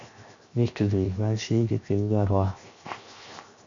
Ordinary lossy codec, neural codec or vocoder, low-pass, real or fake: MP3, 64 kbps; codec, 16 kHz, 0.7 kbps, FocalCodec; 7.2 kHz; fake